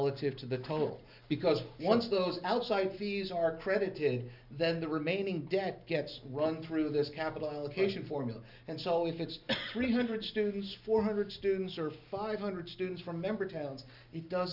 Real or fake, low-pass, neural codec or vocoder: real; 5.4 kHz; none